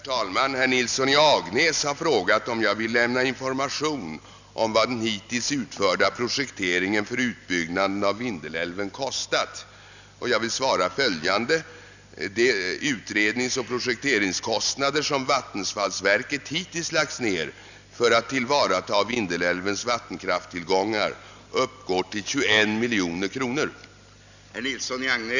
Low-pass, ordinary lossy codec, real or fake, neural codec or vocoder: 7.2 kHz; none; real; none